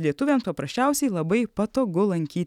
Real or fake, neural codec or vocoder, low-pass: real; none; 19.8 kHz